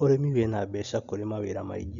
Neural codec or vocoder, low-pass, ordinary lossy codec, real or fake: none; 7.2 kHz; none; real